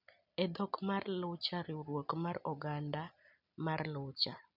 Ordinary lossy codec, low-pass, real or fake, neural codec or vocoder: none; 5.4 kHz; real; none